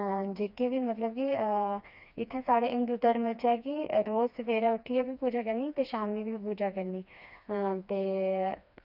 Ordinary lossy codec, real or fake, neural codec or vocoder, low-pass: none; fake; codec, 16 kHz, 2 kbps, FreqCodec, smaller model; 5.4 kHz